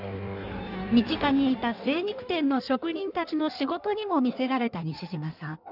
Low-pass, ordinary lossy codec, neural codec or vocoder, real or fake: 5.4 kHz; none; codec, 16 kHz in and 24 kHz out, 1.1 kbps, FireRedTTS-2 codec; fake